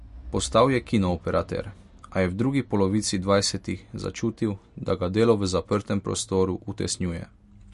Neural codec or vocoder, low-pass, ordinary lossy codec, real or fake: none; 14.4 kHz; MP3, 48 kbps; real